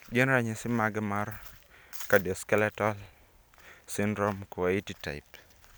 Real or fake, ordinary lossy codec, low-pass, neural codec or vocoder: real; none; none; none